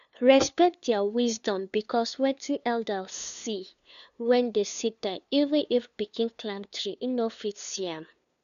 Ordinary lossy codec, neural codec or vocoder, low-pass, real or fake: none; codec, 16 kHz, 2 kbps, FunCodec, trained on LibriTTS, 25 frames a second; 7.2 kHz; fake